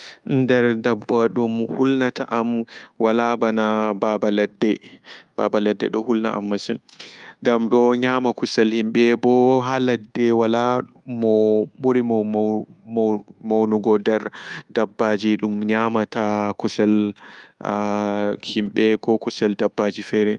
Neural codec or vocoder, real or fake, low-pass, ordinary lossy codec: codec, 24 kHz, 1.2 kbps, DualCodec; fake; 10.8 kHz; Opus, 32 kbps